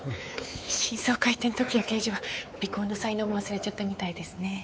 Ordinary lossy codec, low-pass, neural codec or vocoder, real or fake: none; none; codec, 16 kHz, 4 kbps, X-Codec, WavLM features, trained on Multilingual LibriSpeech; fake